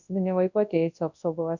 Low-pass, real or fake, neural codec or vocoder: 7.2 kHz; fake; codec, 24 kHz, 0.9 kbps, WavTokenizer, large speech release